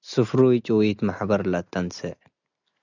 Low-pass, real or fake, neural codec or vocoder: 7.2 kHz; real; none